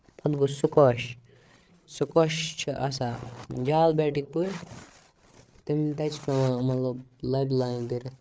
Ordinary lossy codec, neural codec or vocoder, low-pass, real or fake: none; codec, 16 kHz, 8 kbps, FreqCodec, larger model; none; fake